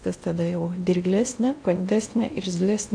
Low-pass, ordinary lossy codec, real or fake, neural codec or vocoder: 9.9 kHz; AAC, 64 kbps; fake; codec, 16 kHz in and 24 kHz out, 0.6 kbps, FocalCodec, streaming, 2048 codes